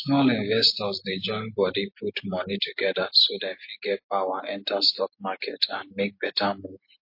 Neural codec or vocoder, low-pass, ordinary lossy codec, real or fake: none; 5.4 kHz; MP3, 32 kbps; real